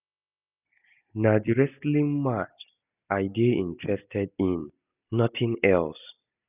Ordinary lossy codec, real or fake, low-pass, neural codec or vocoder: none; real; 3.6 kHz; none